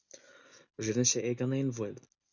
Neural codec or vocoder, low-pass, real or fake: none; 7.2 kHz; real